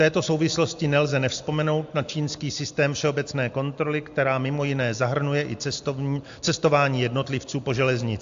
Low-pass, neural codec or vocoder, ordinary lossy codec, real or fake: 7.2 kHz; none; AAC, 64 kbps; real